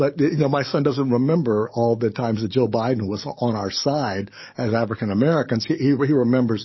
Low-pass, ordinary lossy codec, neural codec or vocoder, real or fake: 7.2 kHz; MP3, 24 kbps; codec, 44.1 kHz, 7.8 kbps, DAC; fake